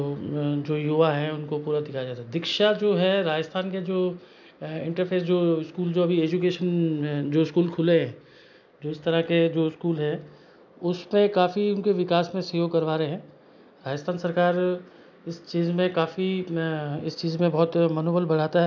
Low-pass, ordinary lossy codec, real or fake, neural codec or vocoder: 7.2 kHz; none; real; none